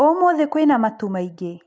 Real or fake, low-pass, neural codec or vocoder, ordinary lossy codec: real; none; none; none